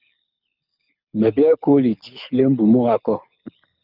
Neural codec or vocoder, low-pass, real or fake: codec, 24 kHz, 6 kbps, HILCodec; 5.4 kHz; fake